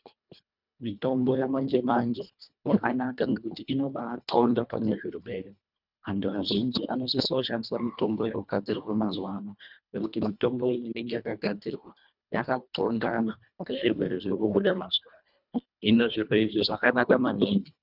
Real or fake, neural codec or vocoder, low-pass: fake; codec, 24 kHz, 1.5 kbps, HILCodec; 5.4 kHz